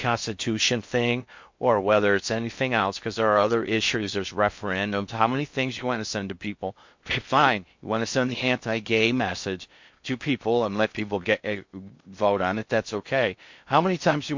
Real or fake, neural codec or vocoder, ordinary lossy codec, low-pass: fake; codec, 16 kHz in and 24 kHz out, 0.6 kbps, FocalCodec, streaming, 4096 codes; MP3, 48 kbps; 7.2 kHz